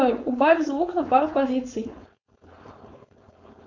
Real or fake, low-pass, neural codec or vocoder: fake; 7.2 kHz; codec, 16 kHz, 4.8 kbps, FACodec